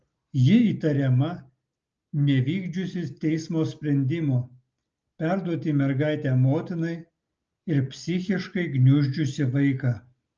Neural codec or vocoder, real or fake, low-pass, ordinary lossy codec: none; real; 7.2 kHz; Opus, 24 kbps